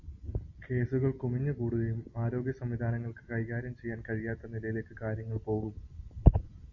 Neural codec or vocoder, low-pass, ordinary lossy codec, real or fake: none; 7.2 kHz; AAC, 48 kbps; real